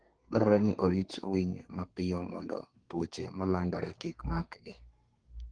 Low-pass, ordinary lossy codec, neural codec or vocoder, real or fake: 9.9 kHz; Opus, 24 kbps; codec, 32 kHz, 1.9 kbps, SNAC; fake